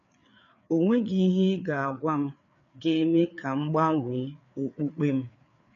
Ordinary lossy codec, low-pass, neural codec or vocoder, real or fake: none; 7.2 kHz; codec, 16 kHz, 4 kbps, FreqCodec, larger model; fake